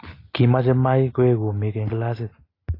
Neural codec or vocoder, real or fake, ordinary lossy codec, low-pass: none; real; AAC, 24 kbps; 5.4 kHz